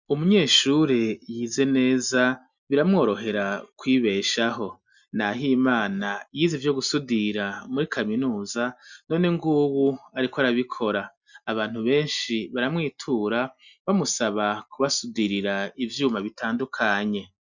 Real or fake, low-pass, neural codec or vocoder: real; 7.2 kHz; none